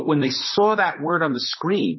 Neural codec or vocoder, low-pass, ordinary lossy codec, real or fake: vocoder, 44.1 kHz, 128 mel bands, Pupu-Vocoder; 7.2 kHz; MP3, 24 kbps; fake